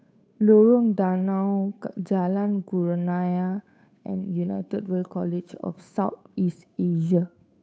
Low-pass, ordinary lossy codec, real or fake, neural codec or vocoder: none; none; fake; codec, 16 kHz, 8 kbps, FunCodec, trained on Chinese and English, 25 frames a second